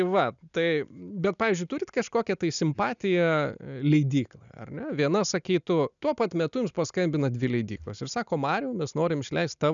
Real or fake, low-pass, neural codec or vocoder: real; 7.2 kHz; none